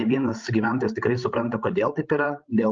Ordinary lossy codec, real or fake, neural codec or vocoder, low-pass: Opus, 32 kbps; fake; codec, 16 kHz, 16 kbps, FreqCodec, larger model; 7.2 kHz